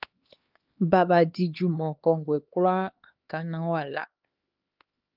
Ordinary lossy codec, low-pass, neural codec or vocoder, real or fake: Opus, 32 kbps; 5.4 kHz; codec, 16 kHz, 2 kbps, X-Codec, HuBERT features, trained on LibriSpeech; fake